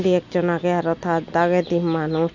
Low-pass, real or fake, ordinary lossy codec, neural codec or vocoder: 7.2 kHz; real; none; none